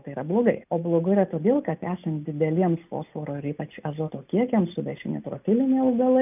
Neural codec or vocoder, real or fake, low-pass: none; real; 3.6 kHz